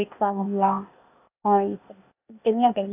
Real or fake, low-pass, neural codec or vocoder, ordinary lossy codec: fake; 3.6 kHz; codec, 16 kHz, 0.8 kbps, ZipCodec; none